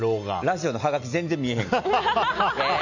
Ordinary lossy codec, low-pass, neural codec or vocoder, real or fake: none; 7.2 kHz; none; real